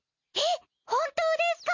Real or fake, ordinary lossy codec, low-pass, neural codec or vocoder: real; MP3, 48 kbps; 7.2 kHz; none